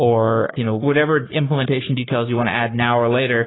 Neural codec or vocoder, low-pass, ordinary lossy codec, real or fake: codec, 44.1 kHz, 3.4 kbps, Pupu-Codec; 7.2 kHz; AAC, 16 kbps; fake